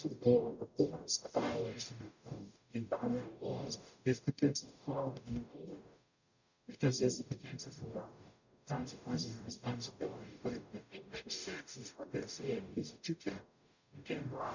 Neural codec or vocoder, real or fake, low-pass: codec, 44.1 kHz, 0.9 kbps, DAC; fake; 7.2 kHz